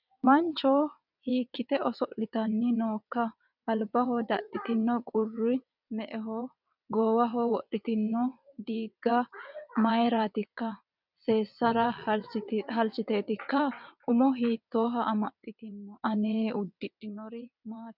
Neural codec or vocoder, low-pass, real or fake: vocoder, 22.05 kHz, 80 mel bands, WaveNeXt; 5.4 kHz; fake